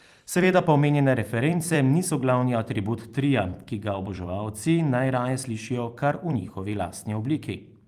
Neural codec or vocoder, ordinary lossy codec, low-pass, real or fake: vocoder, 44.1 kHz, 128 mel bands every 256 samples, BigVGAN v2; Opus, 32 kbps; 14.4 kHz; fake